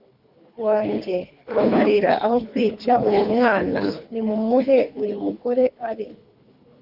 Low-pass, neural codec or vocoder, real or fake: 5.4 kHz; codec, 24 kHz, 3 kbps, HILCodec; fake